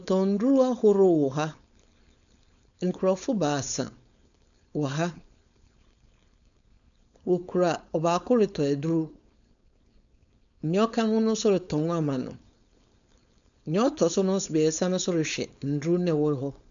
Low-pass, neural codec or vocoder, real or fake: 7.2 kHz; codec, 16 kHz, 4.8 kbps, FACodec; fake